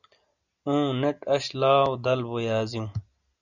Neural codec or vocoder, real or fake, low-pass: none; real; 7.2 kHz